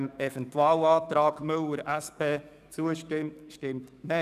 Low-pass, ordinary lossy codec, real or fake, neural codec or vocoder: 14.4 kHz; none; fake; codec, 44.1 kHz, 7.8 kbps, DAC